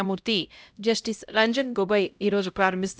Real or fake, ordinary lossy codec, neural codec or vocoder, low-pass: fake; none; codec, 16 kHz, 0.5 kbps, X-Codec, HuBERT features, trained on LibriSpeech; none